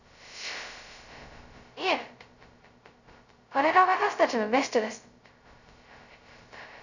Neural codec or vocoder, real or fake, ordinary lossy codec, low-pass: codec, 16 kHz, 0.2 kbps, FocalCodec; fake; none; 7.2 kHz